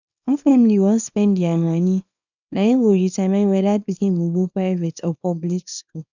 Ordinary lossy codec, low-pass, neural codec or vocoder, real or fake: none; 7.2 kHz; codec, 24 kHz, 0.9 kbps, WavTokenizer, small release; fake